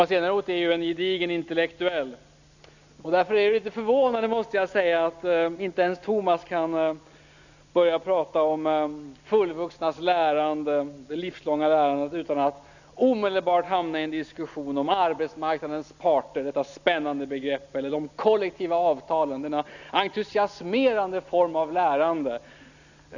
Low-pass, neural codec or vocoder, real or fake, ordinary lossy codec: 7.2 kHz; none; real; none